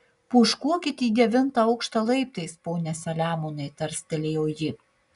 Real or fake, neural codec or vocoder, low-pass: real; none; 10.8 kHz